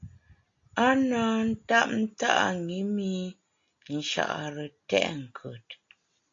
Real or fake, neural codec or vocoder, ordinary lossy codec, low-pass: real; none; AAC, 48 kbps; 7.2 kHz